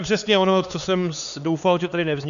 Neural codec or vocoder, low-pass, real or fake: codec, 16 kHz, 4 kbps, X-Codec, HuBERT features, trained on LibriSpeech; 7.2 kHz; fake